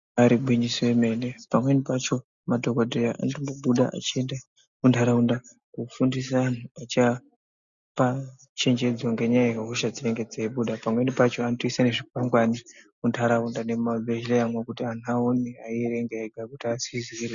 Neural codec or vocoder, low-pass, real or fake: none; 7.2 kHz; real